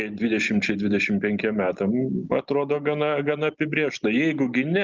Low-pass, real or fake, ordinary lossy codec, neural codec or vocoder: 7.2 kHz; real; Opus, 24 kbps; none